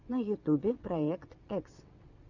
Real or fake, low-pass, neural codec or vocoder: fake; 7.2 kHz; vocoder, 22.05 kHz, 80 mel bands, WaveNeXt